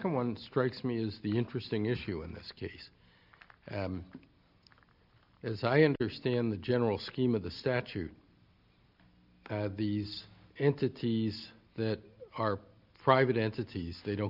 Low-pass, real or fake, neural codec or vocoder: 5.4 kHz; real; none